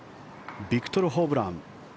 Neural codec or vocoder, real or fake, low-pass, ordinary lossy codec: none; real; none; none